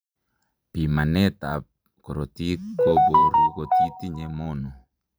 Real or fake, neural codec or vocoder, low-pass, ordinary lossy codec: fake; vocoder, 44.1 kHz, 128 mel bands every 512 samples, BigVGAN v2; none; none